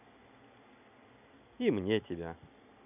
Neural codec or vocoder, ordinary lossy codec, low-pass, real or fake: none; none; 3.6 kHz; real